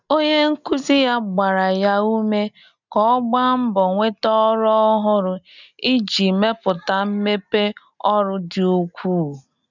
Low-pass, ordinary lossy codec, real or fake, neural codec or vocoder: 7.2 kHz; none; real; none